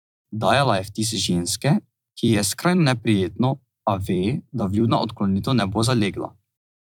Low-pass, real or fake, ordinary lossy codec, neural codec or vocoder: 19.8 kHz; fake; none; vocoder, 44.1 kHz, 128 mel bands every 256 samples, BigVGAN v2